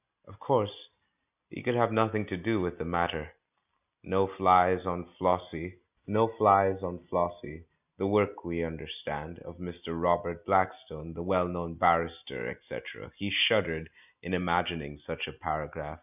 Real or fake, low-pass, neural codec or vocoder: real; 3.6 kHz; none